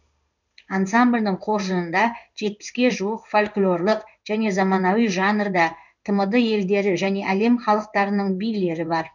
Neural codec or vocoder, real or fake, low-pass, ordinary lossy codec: codec, 16 kHz in and 24 kHz out, 1 kbps, XY-Tokenizer; fake; 7.2 kHz; none